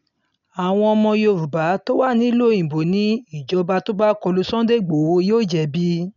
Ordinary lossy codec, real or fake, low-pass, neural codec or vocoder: none; real; 7.2 kHz; none